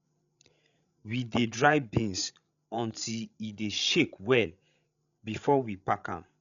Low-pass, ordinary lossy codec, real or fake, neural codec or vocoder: 7.2 kHz; none; fake; codec, 16 kHz, 16 kbps, FreqCodec, larger model